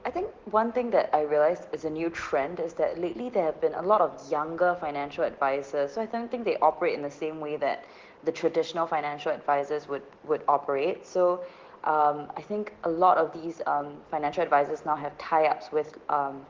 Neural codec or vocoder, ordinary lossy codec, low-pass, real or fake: none; Opus, 16 kbps; 7.2 kHz; real